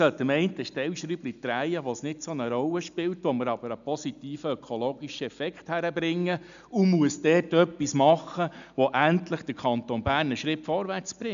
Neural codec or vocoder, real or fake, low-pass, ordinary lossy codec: none; real; 7.2 kHz; none